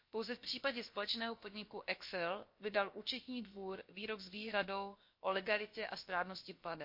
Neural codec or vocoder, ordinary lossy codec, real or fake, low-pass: codec, 16 kHz, about 1 kbps, DyCAST, with the encoder's durations; MP3, 32 kbps; fake; 5.4 kHz